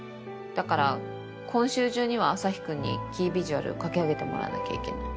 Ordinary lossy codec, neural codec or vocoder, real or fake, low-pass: none; none; real; none